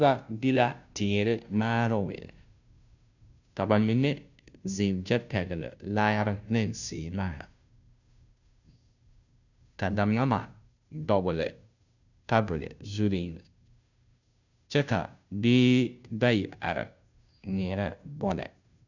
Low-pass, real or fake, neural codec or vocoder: 7.2 kHz; fake; codec, 16 kHz, 0.5 kbps, FunCodec, trained on Chinese and English, 25 frames a second